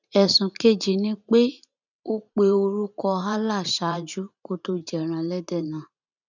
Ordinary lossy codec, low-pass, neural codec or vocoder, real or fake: none; 7.2 kHz; vocoder, 44.1 kHz, 128 mel bands every 512 samples, BigVGAN v2; fake